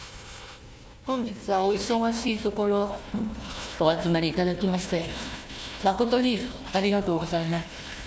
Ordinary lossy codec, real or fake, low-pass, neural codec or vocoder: none; fake; none; codec, 16 kHz, 1 kbps, FunCodec, trained on Chinese and English, 50 frames a second